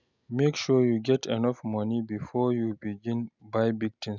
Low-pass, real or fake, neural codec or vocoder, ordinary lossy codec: 7.2 kHz; real; none; none